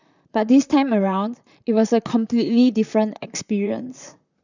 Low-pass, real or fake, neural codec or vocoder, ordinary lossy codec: 7.2 kHz; fake; vocoder, 44.1 kHz, 128 mel bands, Pupu-Vocoder; none